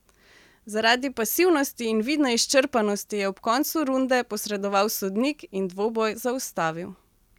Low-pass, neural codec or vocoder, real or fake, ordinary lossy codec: 19.8 kHz; none; real; none